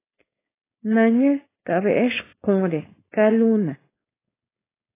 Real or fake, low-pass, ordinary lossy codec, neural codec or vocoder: fake; 3.6 kHz; AAC, 16 kbps; codec, 16 kHz, 4.8 kbps, FACodec